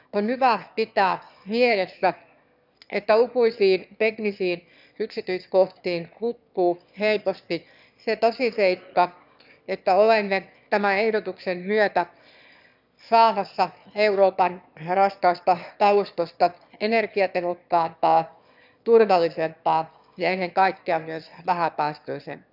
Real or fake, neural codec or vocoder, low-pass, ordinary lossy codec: fake; autoencoder, 22.05 kHz, a latent of 192 numbers a frame, VITS, trained on one speaker; 5.4 kHz; none